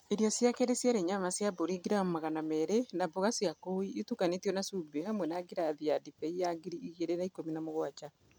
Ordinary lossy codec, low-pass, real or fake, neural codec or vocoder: none; none; real; none